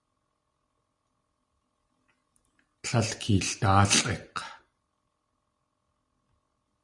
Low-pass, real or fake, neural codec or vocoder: 10.8 kHz; real; none